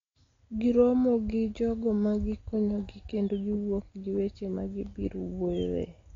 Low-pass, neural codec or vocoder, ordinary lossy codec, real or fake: 7.2 kHz; none; AAC, 32 kbps; real